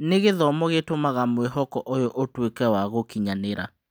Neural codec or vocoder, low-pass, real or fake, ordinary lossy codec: none; none; real; none